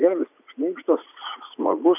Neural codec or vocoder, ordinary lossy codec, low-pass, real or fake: vocoder, 44.1 kHz, 80 mel bands, Vocos; AAC, 32 kbps; 3.6 kHz; fake